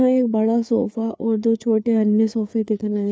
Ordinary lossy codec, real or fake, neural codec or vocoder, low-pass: none; fake; codec, 16 kHz, 4 kbps, FreqCodec, larger model; none